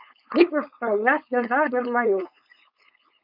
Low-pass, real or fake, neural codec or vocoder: 5.4 kHz; fake; codec, 16 kHz, 4.8 kbps, FACodec